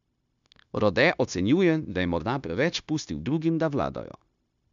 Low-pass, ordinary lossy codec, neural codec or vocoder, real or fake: 7.2 kHz; none; codec, 16 kHz, 0.9 kbps, LongCat-Audio-Codec; fake